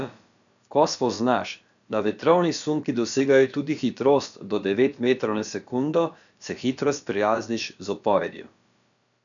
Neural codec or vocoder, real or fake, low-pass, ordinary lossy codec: codec, 16 kHz, about 1 kbps, DyCAST, with the encoder's durations; fake; 7.2 kHz; none